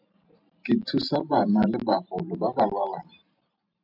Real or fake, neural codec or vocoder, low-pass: fake; vocoder, 44.1 kHz, 128 mel bands every 512 samples, BigVGAN v2; 5.4 kHz